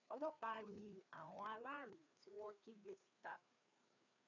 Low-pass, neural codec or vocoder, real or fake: 7.2 kHz; codec, 16 kHz, 2 kbps, FreqCodec, larger model; fake